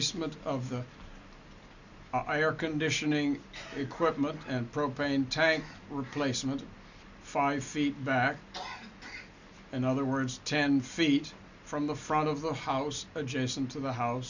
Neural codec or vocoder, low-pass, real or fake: none; 7.2 kHz; real